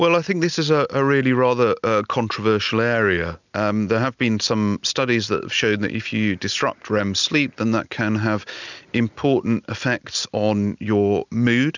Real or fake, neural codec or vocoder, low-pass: real; none; 7.2 kHz